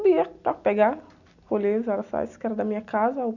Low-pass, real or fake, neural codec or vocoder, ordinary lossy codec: 7.2 kHz; real; none; none